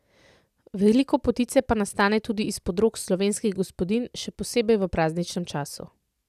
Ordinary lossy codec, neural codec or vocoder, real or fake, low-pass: none; none; real; 14.4 kHz